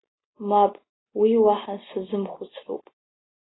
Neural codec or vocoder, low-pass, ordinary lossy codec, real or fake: none; 7.2 kHz; AAC, 16 kbps; real